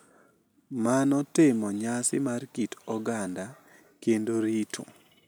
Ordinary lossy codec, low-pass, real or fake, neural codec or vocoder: none; none; real; none